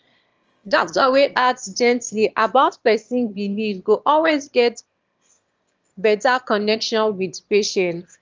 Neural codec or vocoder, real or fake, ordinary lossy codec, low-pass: autoencoder, 22.05 kHz, a latent of 192 numbers a frame, VITS, trained on one speaker; fake; Opus, 24 kbps; 7.2 kHz